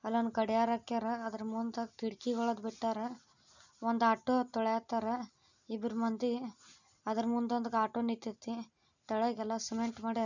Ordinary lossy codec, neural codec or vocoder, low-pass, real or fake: none; none; 7.2 kHz; real